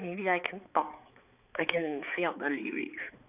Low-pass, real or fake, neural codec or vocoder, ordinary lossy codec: 3.6 kHz; fake; codec, 16 kHz, 4 kbps, X-Codec, HuBERT features, trained on balanced general audio; none